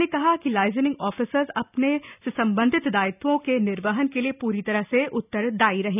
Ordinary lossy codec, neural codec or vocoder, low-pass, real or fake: none; none; 3.6 kHz; real